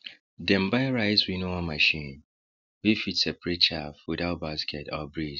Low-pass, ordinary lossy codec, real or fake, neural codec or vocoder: 7.2 kHz; none; real; none